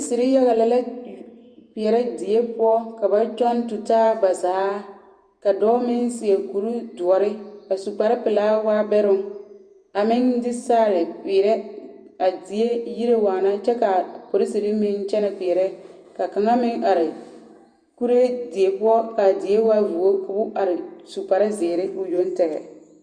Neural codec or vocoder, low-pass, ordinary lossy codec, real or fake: vocoder, 48 kHz, 128 mel bands, Vocos; 9.9 kHz; Opus, 64 kbps; fake